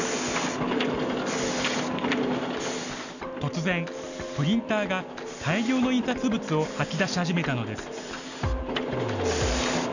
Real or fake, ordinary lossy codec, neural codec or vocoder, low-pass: real; none; none; 7.2 kHz